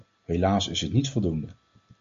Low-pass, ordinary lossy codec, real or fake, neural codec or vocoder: 7.2 kHz; MP3, 48 kbps; real; none